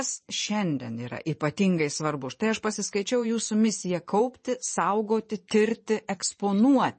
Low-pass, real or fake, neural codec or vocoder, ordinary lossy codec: 10.8 kHz; fake; vocoder, 24 kHz, 100 mel bands, Vocos; MP3, 32 kbps